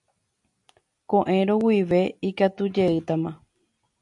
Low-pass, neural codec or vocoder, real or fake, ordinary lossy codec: 10.8 kHz; none; real; AAC, 64 kbps